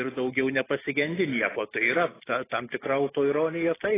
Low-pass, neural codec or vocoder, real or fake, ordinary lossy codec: 3.6 kHz; none; real; AAC, 16 kbps